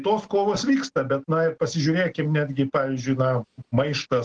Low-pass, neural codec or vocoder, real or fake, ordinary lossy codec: 9.9 kHz; none; real; Opus, 16 kbps